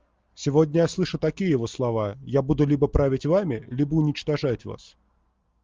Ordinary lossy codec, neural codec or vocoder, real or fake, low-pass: Opus, 32 kbps; none; real; 7.2 kHz